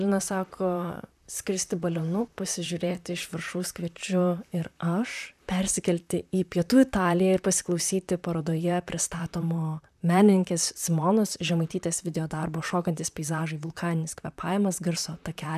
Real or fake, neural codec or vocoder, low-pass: fake; vocoder, 44.1 kHz, 128 mel bands, Pupu-Vocoder; 14.4 kHz